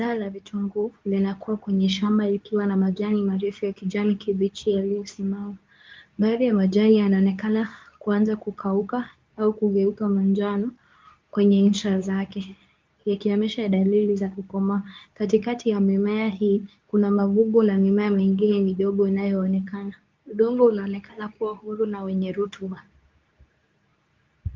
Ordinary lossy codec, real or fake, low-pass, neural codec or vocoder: Opus, 32 kbps; fake; 7.2 kHz; codec, 24 kHz, 0.9 kbps, WavTokenizer, medium speech release version 2